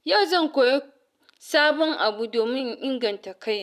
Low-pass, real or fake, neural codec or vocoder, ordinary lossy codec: 14.4 kHz; real; none; none